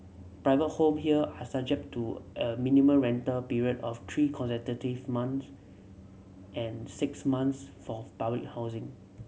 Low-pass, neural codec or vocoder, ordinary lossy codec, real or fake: none; none; none; real